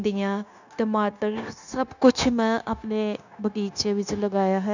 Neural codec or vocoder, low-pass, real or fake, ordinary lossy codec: codec, 16 kHz, 0.9 kbps, LongCat-Audio-Codec; 7.2 kHz; fake; none